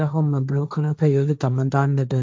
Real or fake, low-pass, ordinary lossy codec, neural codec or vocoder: fake; none; none; codec, 16 kHz, 1.1 kbps, Voila-Tokenizer